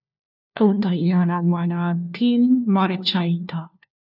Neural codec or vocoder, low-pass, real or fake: codec, 16 kHz, 1 kbps, FunCodec, trained on LibriTTS, 50 frames a second; 5.4 kHz; fake